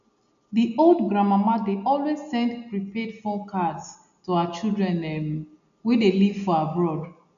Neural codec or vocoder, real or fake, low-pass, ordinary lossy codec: none; real; 7.2 kHz; none